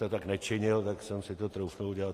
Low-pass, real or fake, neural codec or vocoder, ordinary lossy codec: 14.4 kHz; real; none; AAC, 48 kbps